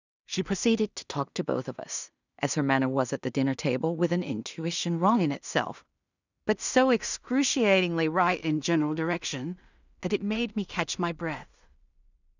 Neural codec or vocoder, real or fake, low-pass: codec, 16 kHz in and 24 kHz out, 0.4 kbps, LongCat-Audio-Codec, two codebook decoder; fake; 7.2 kHz